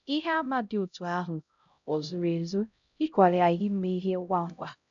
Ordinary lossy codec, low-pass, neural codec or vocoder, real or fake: none; 7.2 kHz; codec, 16 kHz, 0.5 kbps, X-Codec, HuBERT features, trained on LibriSpeech; fake